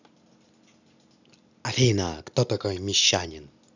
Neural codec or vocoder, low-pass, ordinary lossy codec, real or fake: none; 7.2 kHz; none; real